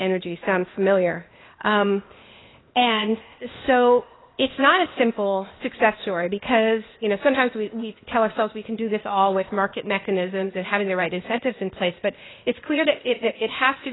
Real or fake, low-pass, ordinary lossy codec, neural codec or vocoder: fake; 7.2 kHz; AAC, 16 kbps; codec, 16 kHz, 0.8 kbps, ZipCodec